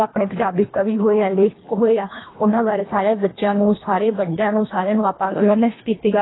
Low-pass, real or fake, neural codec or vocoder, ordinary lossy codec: 7.2 kHz; fake; codec, 24 kHz, 1.5 kbps, HILCodec; AAC, 16 kbps